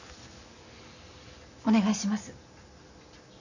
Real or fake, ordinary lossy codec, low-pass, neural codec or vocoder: real; none; 7.2 kHz; none